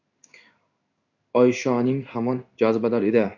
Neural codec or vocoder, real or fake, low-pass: codec, 16 kHz in and 24 kHz out, 1 kbps, XY-Tokenizer; fake; 7.2 kHz